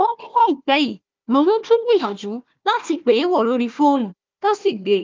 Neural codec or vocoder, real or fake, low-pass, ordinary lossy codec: codec, 16 kHz, 1 kbps, FunCodec, trained on Chinese and English, 50 frames a second; fake; 7.2 kHz; Opus, 24 kbps